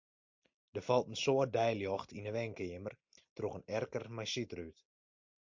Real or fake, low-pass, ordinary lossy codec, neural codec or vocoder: real; 7.2 kHz; MP3, 48 kbps; none